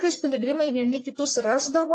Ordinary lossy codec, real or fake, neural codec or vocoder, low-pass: AAC, 48 kbps; fake; codec, 44.1 kHz, 1.7 kbps, Pupu-Codec; 9.9 kHz